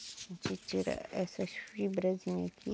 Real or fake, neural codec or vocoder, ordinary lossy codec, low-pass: real; none; none; none